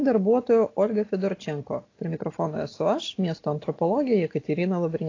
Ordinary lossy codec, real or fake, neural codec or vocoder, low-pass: AAC, 32 kbps; real; none; 7.2 kHz